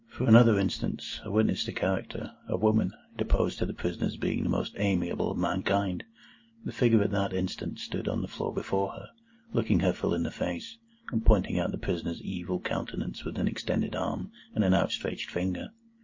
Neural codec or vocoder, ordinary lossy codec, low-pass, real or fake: none; MP3, 32 kbps; 7.2 kHz; real